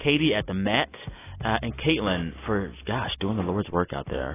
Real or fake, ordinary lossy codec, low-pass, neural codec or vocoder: real; AAC, 16 kbps; 3.6 kHz; none